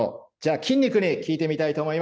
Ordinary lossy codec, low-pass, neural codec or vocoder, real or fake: none; none; none; real